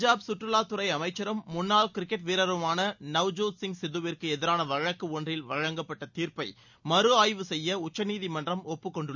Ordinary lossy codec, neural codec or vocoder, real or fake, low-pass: none; none; real; 7.2 kHz